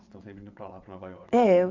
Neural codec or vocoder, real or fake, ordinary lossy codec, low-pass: vocoder, 22.05 kHz, 80 mel bands, WaveNeXt; fake; none; 7.2 kHz